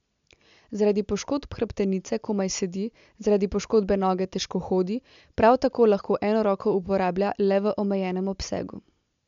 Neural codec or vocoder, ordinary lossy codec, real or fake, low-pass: none; MP3, 64 kbps; real; 7.2 kHz